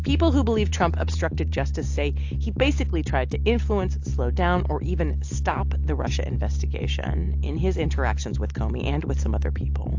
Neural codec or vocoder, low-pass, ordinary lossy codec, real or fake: none; 7.2 kHz; AAC, 48 kbps; real